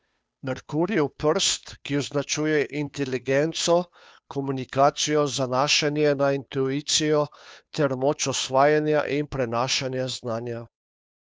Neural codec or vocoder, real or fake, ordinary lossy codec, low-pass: codec, 16 kHz, 2 kbps, FunCodec, trained on Chinese and English, 25 frames a second; fake; none; none